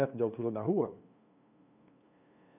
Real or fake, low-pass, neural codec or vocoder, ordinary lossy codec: fake; 3.6 kHz; codec, 16 kHz, 2 kbps, FunCodec, trained on LibriTTS, 25 frames a second; none